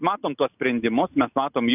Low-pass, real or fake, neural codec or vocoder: 3.6 kHz; real; none